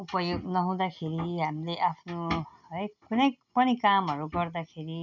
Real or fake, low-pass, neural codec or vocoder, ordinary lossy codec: real; 7.2 kHz; none; none